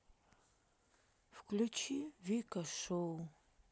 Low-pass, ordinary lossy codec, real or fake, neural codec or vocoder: none; none; real; none